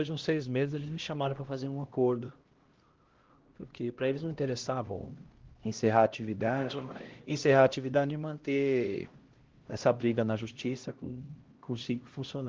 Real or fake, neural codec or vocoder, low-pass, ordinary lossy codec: fake; codec, 16 kHz, 1 kbps, X-Codec, HuBERT features, trained on LibriSpeech; 7.2 kHz; Opus, 16 kbps